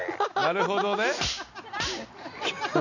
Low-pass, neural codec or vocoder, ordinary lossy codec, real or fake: 7.2 kHz; none; none; real